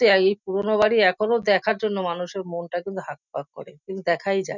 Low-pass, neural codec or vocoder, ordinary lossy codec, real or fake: 7.2 kHz; none; none; real